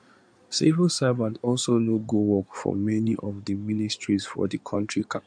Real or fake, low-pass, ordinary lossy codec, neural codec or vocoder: fake; 9.9 kHz; none; codec, 16 kHz in and 24 kHz out, 2.2 kbps, FireRedTTS-2 codec